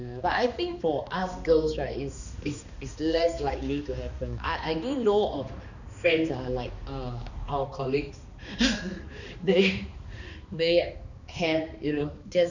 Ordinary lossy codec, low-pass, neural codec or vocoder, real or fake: none; 7.2 kHz; codec, 16 kHz, 2 kbps, X-Codec, HuBERT features, trained on balanced general audio; fake